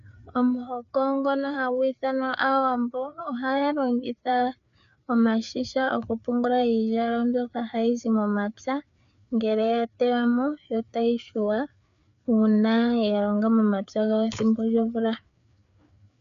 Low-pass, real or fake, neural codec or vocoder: 7.2 kHz; fake; codec, 16 kHz, 4 kbps, FreqCodec, larger model